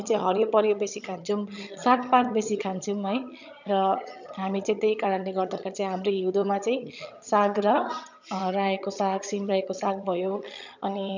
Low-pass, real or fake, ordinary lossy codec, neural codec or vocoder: 7.2 kHz; fake; none; vocoder, 22.05 kHz, 80 mel bands, HiFi-GAN